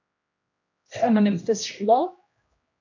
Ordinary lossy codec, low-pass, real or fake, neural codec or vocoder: AAC, 48 kbps; 7.2 kHz; fake; codec, 16 kHz, 1 kbps, X-Codec, HuBERT features, trained on general audio